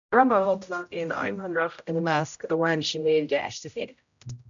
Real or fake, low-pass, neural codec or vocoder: fake; 7.2 kHz; codec, 16 kHz, 0.5 kbps, X-Codec, HuBERT features, trained on general audio